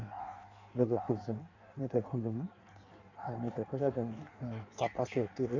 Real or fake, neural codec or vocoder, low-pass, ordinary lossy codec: fake; codec, 16 kHz in and 24 kHz out, 1.1 kbps, FireRedTTS-2 codec; 7.2 kHz; none